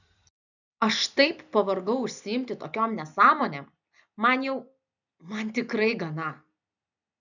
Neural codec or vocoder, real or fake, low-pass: none; real; 7.2 kHz